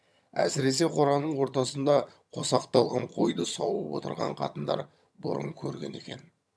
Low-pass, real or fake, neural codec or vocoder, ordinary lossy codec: none; fake; vocoder, 22.05 kHz, 80 mel bands, HiFi-GAN; none